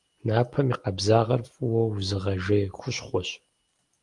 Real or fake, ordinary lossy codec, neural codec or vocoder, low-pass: real; Opus, 24 kbps; none; 10.8 kHz